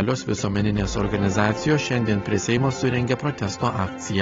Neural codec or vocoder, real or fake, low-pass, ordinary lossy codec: none; real; 7.2 kHz; AAC, 24 kbps